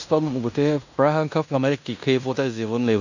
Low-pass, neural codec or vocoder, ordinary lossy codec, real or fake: 7.2 kHz; codec, 16 kHz in and 24 kHz out, 0.9 kbps, LongCat-Audio-Codec, fine tuned four codebook decoder; none; fake